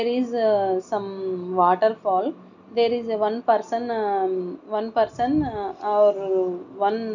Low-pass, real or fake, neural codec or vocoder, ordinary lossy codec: 7.2 kHz; real; none; none